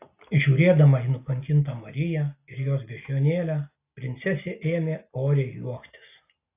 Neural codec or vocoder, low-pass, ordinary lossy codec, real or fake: none; 3.6 kHz; AAC, 24 kbps; real